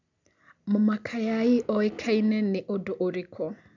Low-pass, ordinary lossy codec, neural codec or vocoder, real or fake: 7.2 kHz; none; none; real